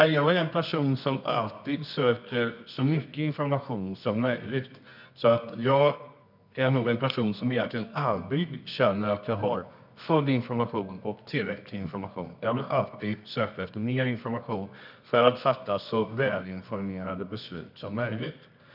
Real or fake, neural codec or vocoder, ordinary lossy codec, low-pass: fake; codec, 24 kHz, 0.9 kbps, WavTokenizer, medium music audio release; none; 5.4 kHz